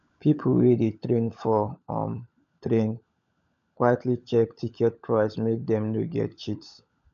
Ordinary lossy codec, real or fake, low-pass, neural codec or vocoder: none; fake; 7.2 kHz; codec, 16 kHz, 16 kbps, FunCodec, trained on LibriTTS, 50 frames a second